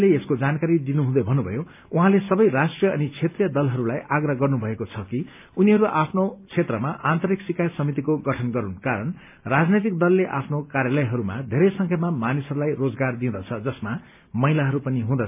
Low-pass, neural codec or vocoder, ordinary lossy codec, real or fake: 3.6 kHz; none; MP3, 32 kbps; real